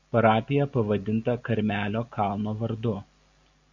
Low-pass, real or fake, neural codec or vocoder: 7.2 kHz; real; none